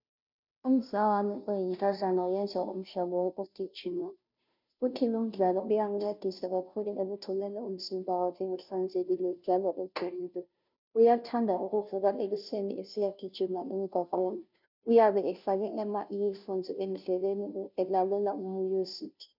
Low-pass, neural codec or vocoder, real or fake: 5.4 kHz; codec, 16 kHz, 0.5 kbps, FunCodec, trained on Chinese and English, 25 frames a second; fake